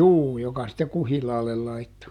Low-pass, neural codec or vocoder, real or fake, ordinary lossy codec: 19.8 kHz; none; real; none